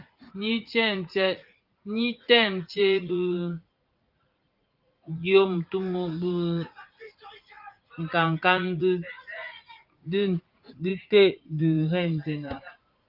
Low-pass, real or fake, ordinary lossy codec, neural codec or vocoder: 5.4 kHz; fake; Opus, 24 kbps; vocoder, 44.1 kHz, 80 mel bands, Vocos